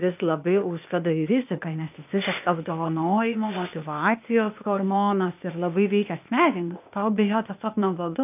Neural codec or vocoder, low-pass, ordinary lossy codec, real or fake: codec, 16 kHz, 0.8 kbps, ZipCodec; 3.6 kHz; AAC, 32 kbps; fake